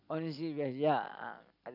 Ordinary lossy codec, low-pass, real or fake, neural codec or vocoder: none; 5.4 kHz; real; none